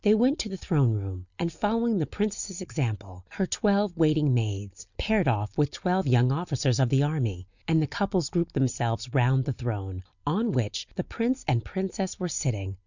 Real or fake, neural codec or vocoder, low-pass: real; none; 7.2 kHz